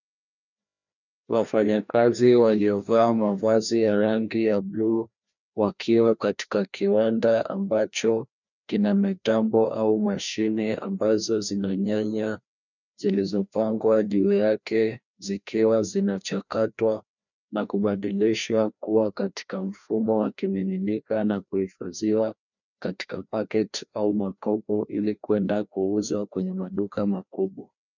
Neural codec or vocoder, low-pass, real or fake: codec, 16 kHz, 1 kbps, FreqCodec, larger model; 7.2 kHz; fake